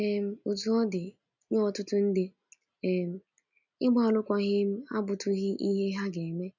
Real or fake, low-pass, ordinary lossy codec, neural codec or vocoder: real; 7.2 kHz; none; none